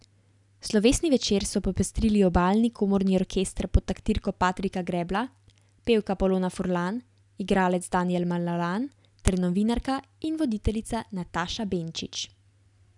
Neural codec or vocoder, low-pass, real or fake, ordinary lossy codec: none; 10.8 kHz; real; none